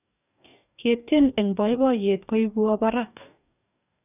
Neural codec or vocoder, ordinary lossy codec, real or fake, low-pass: codec, 44.1 kHz, 2.6 kbps, DAC; none; fake; 3.6 kHz